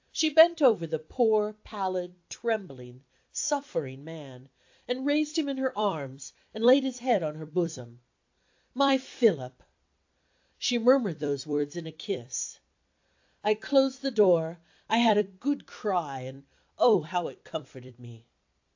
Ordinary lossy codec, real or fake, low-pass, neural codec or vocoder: AAC, 48 kbps; fake; 7.2 kHz; vocoder, 44.1 kHz, 128 mel bands every 256 samples, BigVGAN v2